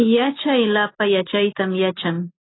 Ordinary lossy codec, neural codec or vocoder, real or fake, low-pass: AAC, 16 kbps; none; real; 7.2 kHz